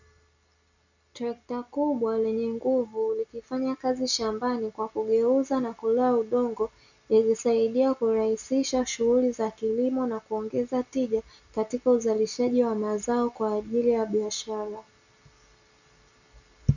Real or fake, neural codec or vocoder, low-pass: real; none; 7.2 kHz